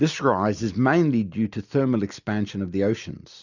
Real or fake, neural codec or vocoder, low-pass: real; none; 7.2 kHz